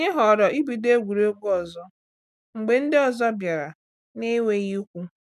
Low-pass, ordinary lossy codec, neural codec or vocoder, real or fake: 14.4 kHz; none; none; real